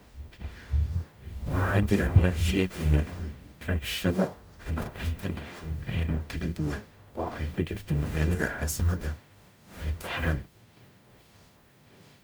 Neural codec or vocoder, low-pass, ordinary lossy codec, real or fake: codec, 44.1 kHz, 0.9 kbps, DAC; none; none; fake